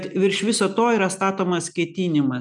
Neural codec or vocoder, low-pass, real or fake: none; 10.8 kHz; real